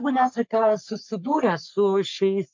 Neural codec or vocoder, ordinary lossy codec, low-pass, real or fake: codec, 44.1 kHz, 3.4 kbps, Pupu-Codec; MP3, 64 kbps; 7.2 kHz; fake